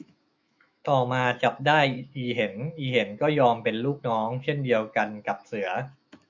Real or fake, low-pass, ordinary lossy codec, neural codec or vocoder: fake; 7.2 kHz; Opus, 64 kbps; autoencoder, 48 kHz, 128 numbers a frame, DAC-VAE, trained on Japanese speech